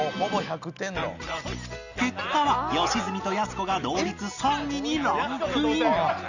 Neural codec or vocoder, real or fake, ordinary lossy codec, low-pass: none; real; none; 7.2 kHz